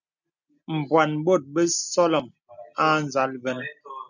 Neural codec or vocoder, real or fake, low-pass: none; real; 7.2 kHz